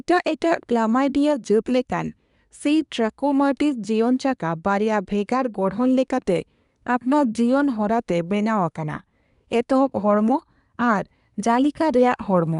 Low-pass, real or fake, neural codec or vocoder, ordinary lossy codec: 10.8 kHz; fake; codec, 24 kHz, 1 kbps, SNAC; none